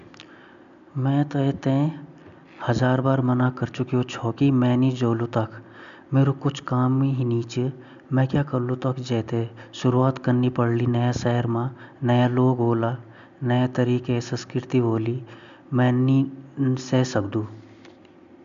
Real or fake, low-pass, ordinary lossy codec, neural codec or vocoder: real; 7.2 kHz; MP3, 64 kbps; none